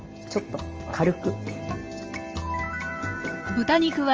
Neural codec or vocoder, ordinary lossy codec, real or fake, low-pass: none; Opus, 24 kbps; real; 7.2 kHz